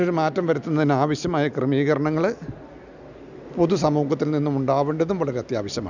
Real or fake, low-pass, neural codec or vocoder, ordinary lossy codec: real; 7.2 kHz; none; none